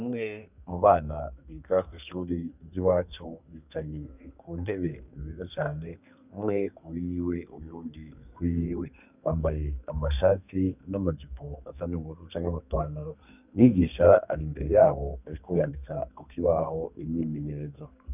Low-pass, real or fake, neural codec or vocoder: 3.6 kHz; fake; codec, 32 kHz, 1.9 kbps, SNAC